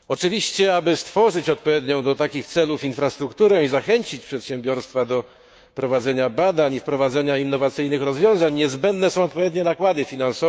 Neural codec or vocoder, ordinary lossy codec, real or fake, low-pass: codec, 16 kHz, 6 kbps, DAC; none; fake; none